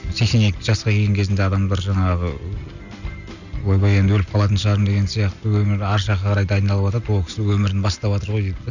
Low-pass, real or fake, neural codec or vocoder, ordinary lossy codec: 7.2 kHz; real; none; none